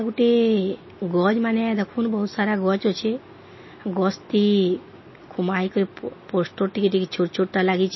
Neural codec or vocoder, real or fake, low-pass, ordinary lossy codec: none; real; 7.2 kHz; MP3, 24 kbps